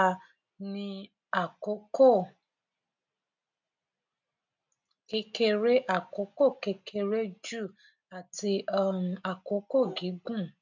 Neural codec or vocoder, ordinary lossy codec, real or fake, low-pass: none; none; real; 7.2 kHz